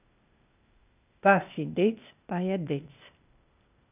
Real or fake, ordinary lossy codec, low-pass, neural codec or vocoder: fake; none; 3.6 kHz; codec, 16 kHz, 0.8 kbps, ZipCodec